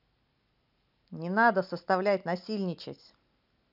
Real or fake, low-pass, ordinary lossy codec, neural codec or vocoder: real; 5.4 kHz; none; none